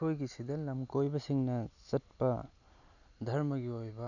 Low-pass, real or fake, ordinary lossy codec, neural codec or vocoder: 7.2 kHz; real; none; none